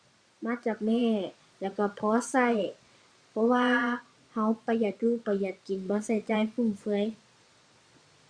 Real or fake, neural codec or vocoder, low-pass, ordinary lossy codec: fake; vocoder, 22.05 kHz, 80 mel bands, Vocos; 9.9 kHz; Opus, 64 kbps